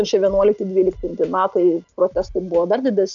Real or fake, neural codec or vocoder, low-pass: real; none; 7.2 kHz